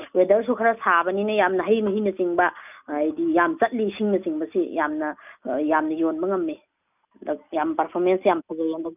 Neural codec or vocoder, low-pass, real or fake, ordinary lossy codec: none; 3.6 kHz; real; none